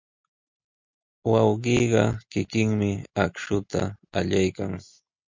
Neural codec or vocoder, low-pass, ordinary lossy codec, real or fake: none; 7.2 kHz; MP3, 64 kbps; real